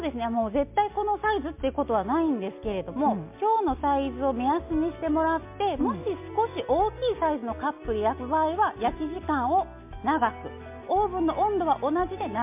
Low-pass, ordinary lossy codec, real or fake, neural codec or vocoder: 3.6 kHz; MP3, 32 kbps; real; none